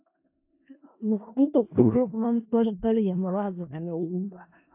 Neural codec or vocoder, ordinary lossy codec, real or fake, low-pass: codec, 16 kHz in and 24 kHz out, 0.4 kbps, LongCat-Audio-Codec, four codebook decoder; AAC, 32 kbps; fake; 3.6 kHz